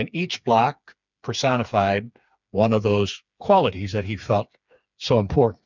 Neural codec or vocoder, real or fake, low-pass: codec, 16 kHz, 4 kbps, FreqCodec, smaller model; fake; 7.2 kHz